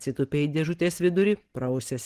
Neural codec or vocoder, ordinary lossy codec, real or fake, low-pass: none; Opus, 16 kbps; real; 14.4 kHz